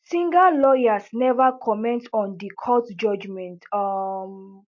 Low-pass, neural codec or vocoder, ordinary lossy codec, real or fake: 7.2 kHz; none; MP3, 48 kbps; real